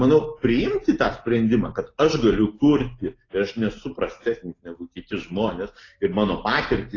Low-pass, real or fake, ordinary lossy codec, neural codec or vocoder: 7.2 kHz; real; AAC, 32 kbps; none